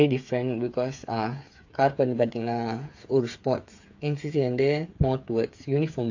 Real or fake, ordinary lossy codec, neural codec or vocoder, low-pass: fake; AAC, 48 kbps; codec, 16 kHz, 8 kbps, FreqCodec, smaller model; 7.2 kHz